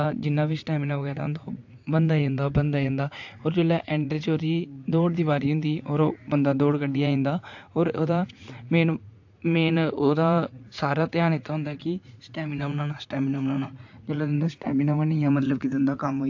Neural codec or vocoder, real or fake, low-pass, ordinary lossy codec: vocoder, 44.1 kHz, 80 mel bands, Vocos; fake; 7.2 kHz; AAC, 48 kbps